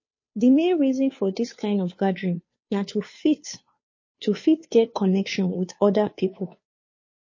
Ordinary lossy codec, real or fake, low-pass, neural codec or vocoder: MP3, 32 kbps; fake; 7.2 kHz; codec, 16 kHz, 2 kbps, FunCodec, trained on Chinese and English, 25 frames a second